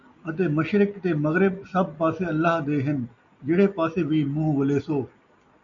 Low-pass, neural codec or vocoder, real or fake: 7.2 kHz; none; real